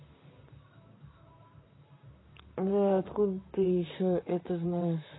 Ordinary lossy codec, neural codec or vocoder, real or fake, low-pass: AAC, 16 kbps; vocoder, 44.1 kHz, 128 mel bands, Pupu-Vocoder; fake; 7.2 kHz